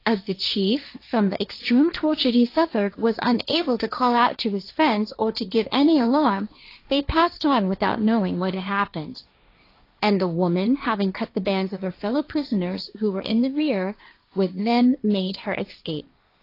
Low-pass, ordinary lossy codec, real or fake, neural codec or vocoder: 5.4 kHz; AAC, 32 kbps; fake; codec, 16 kHz, 1.1 kbps, Voila-Tokenizer